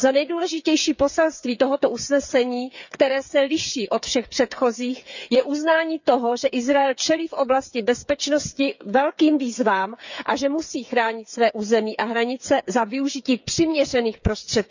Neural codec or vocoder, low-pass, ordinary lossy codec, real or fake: codec, 16 kHz, 8 kbps, FreqCodec, smaller model; 7.2 kHz; none; fake